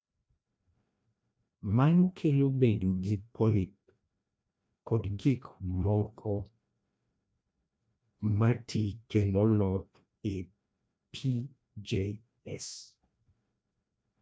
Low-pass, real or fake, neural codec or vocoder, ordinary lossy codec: none; fake; codec, 16 kHz, 1 kbps, FreqCodec, larger model; none